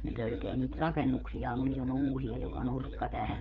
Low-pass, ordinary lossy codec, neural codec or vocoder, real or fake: 7.2 kHz; none; codec, 16 kHz, 4 kbps, FreqCodec, larger model; fake